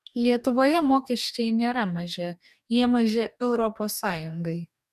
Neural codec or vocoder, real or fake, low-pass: codec, 44.1 kHz, 2.6 kbps, DAC; fake; 14.4 kHz